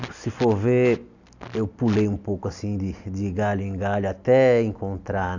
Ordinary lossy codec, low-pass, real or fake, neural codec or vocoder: none; 7.2 kHz; real; none